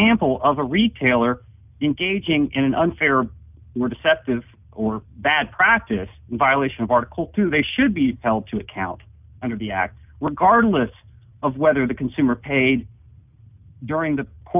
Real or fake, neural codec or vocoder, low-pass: real; none; 3.6 kHz